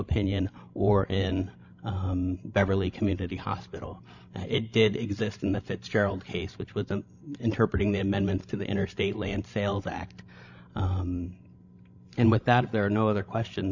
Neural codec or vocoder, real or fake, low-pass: codec, 16 kHz, 16 kbps, FreqCodec, larger model; fake; 7.2 kHz